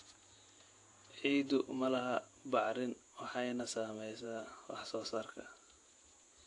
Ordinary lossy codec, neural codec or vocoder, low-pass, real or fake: AAC, 48 kbps; vocoder, 48 kHz, 128 mel bands, Vocos; 10.8 kHz; fake